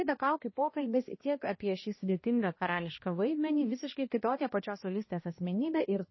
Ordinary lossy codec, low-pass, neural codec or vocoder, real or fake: MP3, 24 kbps; 7.2 kHz; codec, 16 kHz, 1 kbps, X-Codec, HuBERT features, trained on balanced general audio; fake